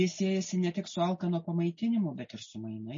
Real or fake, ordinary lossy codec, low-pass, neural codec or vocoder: real; MP3, 32 kbps; 7.2 kHz; none